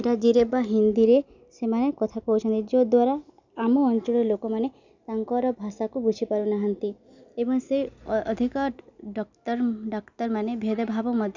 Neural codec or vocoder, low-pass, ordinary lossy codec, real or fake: none; 7.2 kHz; none; real